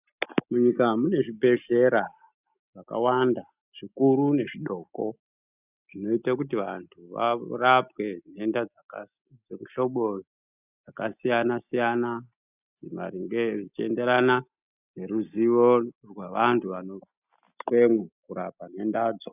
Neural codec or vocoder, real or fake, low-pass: none; real; 3.6 kHz